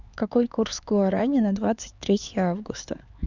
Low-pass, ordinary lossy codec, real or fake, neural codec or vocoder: 7.2 kHz; Opus, 64 kbps; fake; codec, 16 kHz, 4 kbps, X-Codec, HuBERT features, trained on LibriSpeech